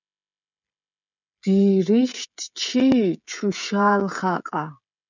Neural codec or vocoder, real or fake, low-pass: codec, 16 kHz, 16 kbps, FreqCodec, smaller model; fake; 7.2 kHz